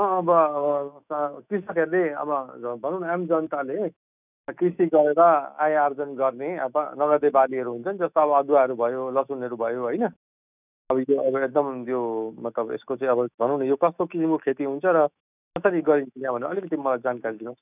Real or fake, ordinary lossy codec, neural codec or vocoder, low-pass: fake; none; autoencoder, 48 kHz, 128 numbers a frame, DAC-VAE, trained on Japanese speech; 3.6 kHz